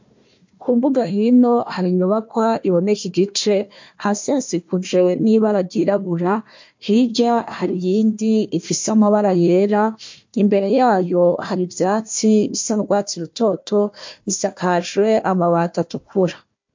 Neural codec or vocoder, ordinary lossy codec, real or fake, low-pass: codec, 16 kHz, 1 kbps, FunCodec, trained on Chinese and English, 50 frames a second; MP3, 48 kbps; fake; 7.2 kHz